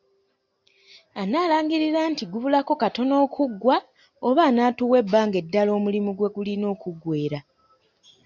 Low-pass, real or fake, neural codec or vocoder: 7.2 kHz; real; none